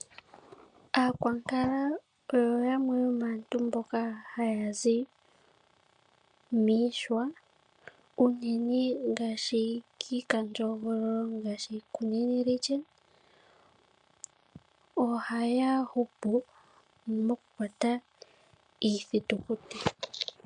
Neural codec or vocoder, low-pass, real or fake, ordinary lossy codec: none; 9.9 kHz; real; MP3, 64 kbps